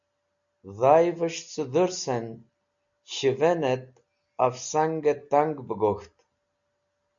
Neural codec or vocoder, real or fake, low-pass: none; real; 7.2 kHz